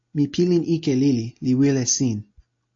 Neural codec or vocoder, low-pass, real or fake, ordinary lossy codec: none; 7.2 kHz; real; AAC, 48 kbps